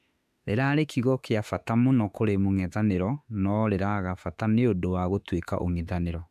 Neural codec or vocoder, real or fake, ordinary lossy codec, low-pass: autoencoder, 48 kHz, 32 numbers a frame, DAC-VAE, trained on Japanese speech; fake; none; 14.4 kHz